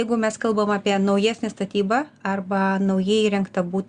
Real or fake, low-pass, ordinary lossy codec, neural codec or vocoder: real; 9.9 kHz; Opus, 64 kbps; none